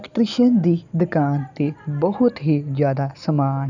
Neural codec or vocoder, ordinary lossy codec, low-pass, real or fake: none; none; 7.2 kHz; real